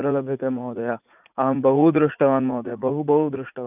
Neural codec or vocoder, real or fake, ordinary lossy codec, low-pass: vocoder, 44.1 kHz, 80 mel bands, Vocos; fake; none; 3.6 kHz